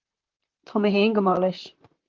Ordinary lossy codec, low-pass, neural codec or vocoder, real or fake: Opus, 32 kbps; 7.2 kHz; vocoder, 44.1 kHz, 128 mel bands, Pupu-Vocoder; fake